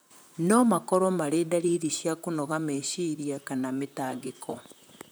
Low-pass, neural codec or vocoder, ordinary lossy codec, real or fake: none; vocoder, 44.1 kHz, 128 mel bands, Pupu-Vocoder; none; fake